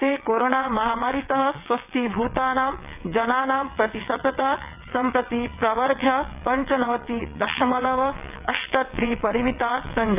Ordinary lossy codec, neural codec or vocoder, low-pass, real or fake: none; vocoder, 22.05 kHz, 80 mel bands, WaveNeXt; 3.6 kHz; fake